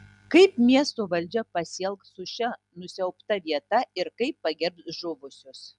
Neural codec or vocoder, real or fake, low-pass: none; real; 10.8 kHz